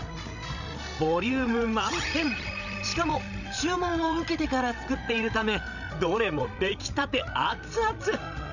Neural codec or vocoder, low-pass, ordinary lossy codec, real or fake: codec, 16 kHz, 16 kbps, FreqCodec, larger model; 7.2 kHz; none; fake